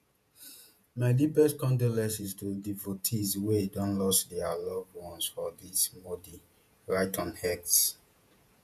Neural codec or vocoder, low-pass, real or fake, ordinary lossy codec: vocoder, 48 kHz, 128 mel bands, Vocos; 14.4 kHz; fake; none